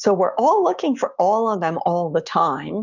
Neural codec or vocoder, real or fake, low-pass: codec, 16 kHz, 6 kbps, DAC; fake; 7.2 kHz